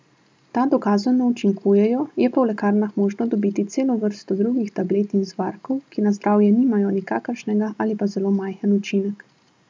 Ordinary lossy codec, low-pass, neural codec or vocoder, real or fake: none; none; none; real